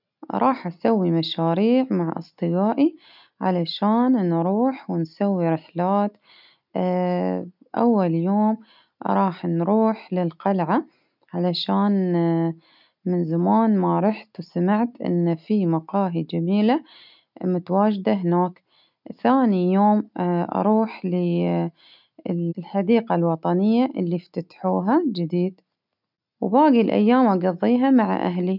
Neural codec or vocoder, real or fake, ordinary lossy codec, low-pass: none; real; none; 5.4 kHz